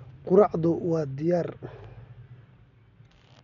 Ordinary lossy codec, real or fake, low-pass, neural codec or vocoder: none; real; 7.2 kHz; none